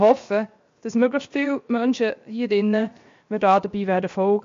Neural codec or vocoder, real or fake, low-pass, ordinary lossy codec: codec, 16 kHz, 0.7 kbps, FocalCodec; fake; 7.2 kHz; MP3, 48 kbps